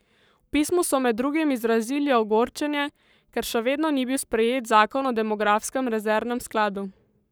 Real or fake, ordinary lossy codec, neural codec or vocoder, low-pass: fake; none; codec, 44.1 kHz, 7.8 kbps, Pupu-Codec; none